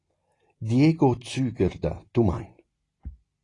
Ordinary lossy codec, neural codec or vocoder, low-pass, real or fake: AAC, 32 kbps; none; 10.8 kHz; real